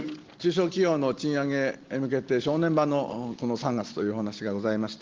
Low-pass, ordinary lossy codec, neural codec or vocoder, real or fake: 7.2 kHz; Opus, 16 kbps; none; real